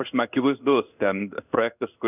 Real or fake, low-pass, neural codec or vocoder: fake; 3.6 kHz; codec, 16 kHz in and 24 kHz out, 1 kbps, XY-Tokenizer